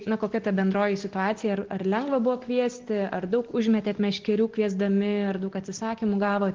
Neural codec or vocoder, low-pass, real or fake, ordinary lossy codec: none; 7.2 kHz; real; Opus, 16 kbps